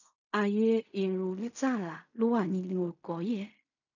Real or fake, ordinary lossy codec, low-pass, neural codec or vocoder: fake; none; 7.2 kHz; codec, 16 kHz in and 24 kHz out, 0.4 kbps, LongCat-Audio-Codec, fine tuned four codebook decoder